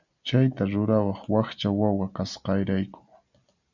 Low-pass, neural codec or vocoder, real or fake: 7.2 kHz; none; real